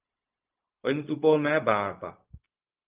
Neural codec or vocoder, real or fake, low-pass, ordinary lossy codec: codec, 16 kHz, 0.4 kbps, LongCat-Audio-Codec; fake; 3.6 kHz; Opus, 32 kbps